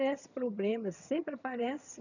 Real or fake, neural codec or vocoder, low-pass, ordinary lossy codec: fake; vocoder, 22.05 kHz, 80 mel bands, HiFi-GAN; 7.2 kHz; none